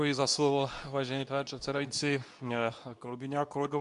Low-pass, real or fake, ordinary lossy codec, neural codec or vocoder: 10.8 kHz; fake; MP3, 96 kbps; codec, 24 kHz, 0.9 kbps, WavTokenizer, medium speech release version 2